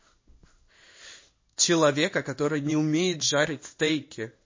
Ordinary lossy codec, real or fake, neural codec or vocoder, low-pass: MP3, 32 kbps; fake; codec, 16 kHz in and 24 kHz out, 1 kbps, XY-Tokenizer; 7.2 kHz